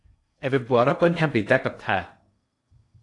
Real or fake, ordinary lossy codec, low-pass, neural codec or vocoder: fake; AAC, 48 kbps; 10.8 kHz; codec, 16 kHz in and 24 kHz out, 0.8 kbps, FocalCodec, streaming, 65536 codes